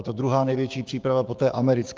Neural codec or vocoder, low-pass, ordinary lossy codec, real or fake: codec, 16 kHz, 6 kbps, DAC; 7.2 kHz; Opus, 24 kbps; fake